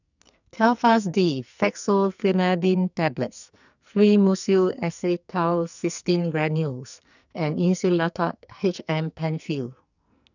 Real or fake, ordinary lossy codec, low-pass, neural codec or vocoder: fake; none; 7.2 kHz; codec, 44.1 kHz, 2.6 kbps, SNAC